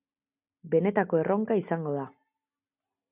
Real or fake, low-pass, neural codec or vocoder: real; 3.6 kHz; none